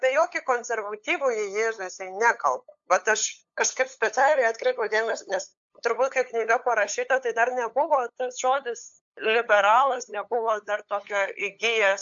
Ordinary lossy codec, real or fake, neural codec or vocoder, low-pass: AAC, 64 kbps; fake; codec, 16 kHz, 8 kbps, FunCodec, trained on LibriTTS, 25 frames a second; 7.2 kHz